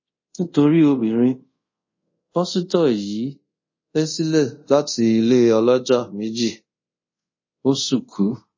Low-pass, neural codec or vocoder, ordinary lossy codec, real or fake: 7.2 kHz; codec, 24 kHz, 0.5 kbps, DualCodec; MP3, 32 kbps; fake